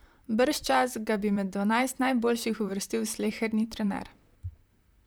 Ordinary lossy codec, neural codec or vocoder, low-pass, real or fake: none; vocoder, 44.1 kHz, 128 mel bands, Pupu-Vocoder; none; fake